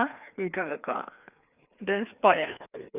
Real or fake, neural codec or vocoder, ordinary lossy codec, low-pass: fake; codec, 16 kHz, 2 kbps, FreqCodec, larger model; none; 3.6 kHz